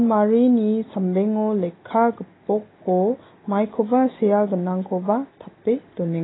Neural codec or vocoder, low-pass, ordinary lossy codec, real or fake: none; 7.2 kHz; AAC, 16 kbps; real